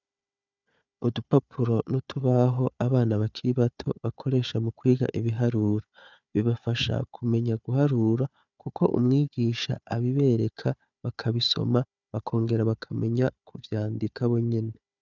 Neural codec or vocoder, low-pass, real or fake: codec, 16 kHz, 4 kbps, FunCodec, trained on Chinese and English, 50 frames a second; 7.2 kHz; fake